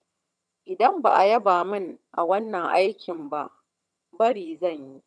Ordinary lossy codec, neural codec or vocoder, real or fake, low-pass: none; vocoder, 22.05 kHz, 80 mel bands, HiFi-GAN; fake; none